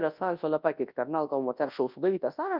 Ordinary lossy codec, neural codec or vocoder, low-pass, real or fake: Opus, 32 kbps; codec, 24 kHz, 0.9 kbps, WavTokenizer, large speech release; 5.4 kHz; fake